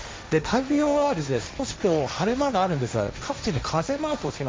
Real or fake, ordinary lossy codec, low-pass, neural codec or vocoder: fake; none; none; codec, 16 kHz, 1.1 kbps, Voila-Tokenizer